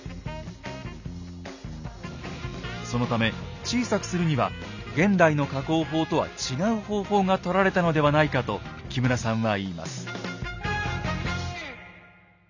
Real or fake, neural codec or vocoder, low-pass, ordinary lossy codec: real; none; 7.2 kHz; none